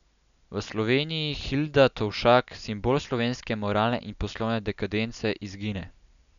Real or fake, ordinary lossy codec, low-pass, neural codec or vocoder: real; none; 7.2 kHz; none